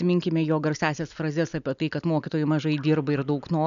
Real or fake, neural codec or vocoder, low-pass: real; none; 7.2 kHz